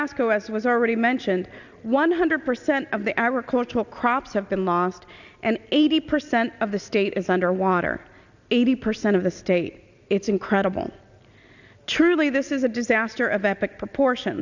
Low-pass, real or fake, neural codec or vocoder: 7.2 kHz; real; none